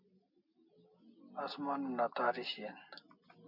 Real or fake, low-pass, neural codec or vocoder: real; 5.4 kHz; none